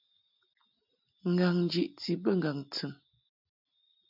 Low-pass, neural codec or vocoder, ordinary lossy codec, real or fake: 5.4 kHz; none; MP3, 48 kbps; real